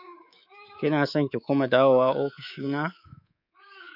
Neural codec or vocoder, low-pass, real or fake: codec, 24 kHz, 3.1 kbps, DualCodec; 5.4 kHz; fake